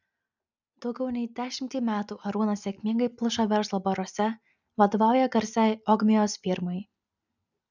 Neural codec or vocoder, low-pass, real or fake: none; 7.2 kHz; real